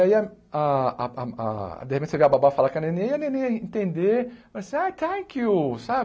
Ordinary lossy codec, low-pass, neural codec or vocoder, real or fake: none; none; none; real